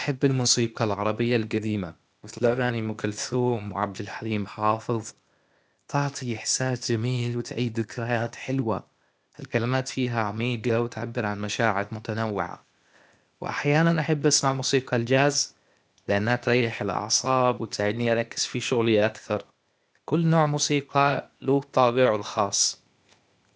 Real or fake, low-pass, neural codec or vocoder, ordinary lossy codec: fake; none; codec, 16 kHz, 0.8 kbps, ZipCodec; none